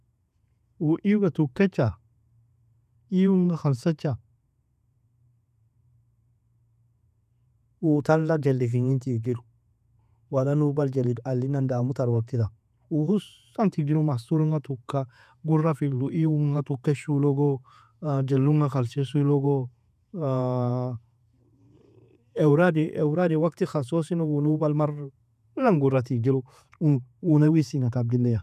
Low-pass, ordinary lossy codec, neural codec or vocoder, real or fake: 14.4 kHz; none; vocoder, 48 kHz, 128 mel bands, Vocos; fake